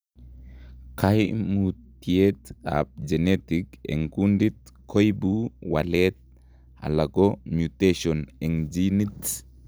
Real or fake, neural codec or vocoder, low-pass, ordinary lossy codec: real; none; none; none